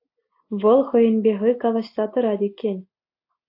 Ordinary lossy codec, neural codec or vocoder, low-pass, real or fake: AAC, 32 kbps; none; 5.4 kHz; real